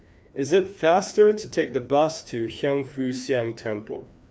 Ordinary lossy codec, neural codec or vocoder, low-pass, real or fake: none; codec, 16 kHz, 2 kbps, FreqCodec, larger model; none; fake